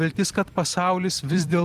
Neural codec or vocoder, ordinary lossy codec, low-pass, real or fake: vocoder, 44.1 kHz, 128 mel bands every 256 samples, BigVGAN v2; Opus, 24 kbps; 14.4 kHz; fake